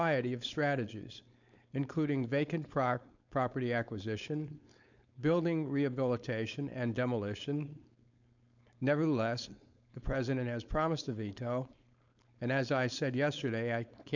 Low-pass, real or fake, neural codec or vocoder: 7.2 kHz; fake; codec, 16 kHz, 4.8 kbps, FACodec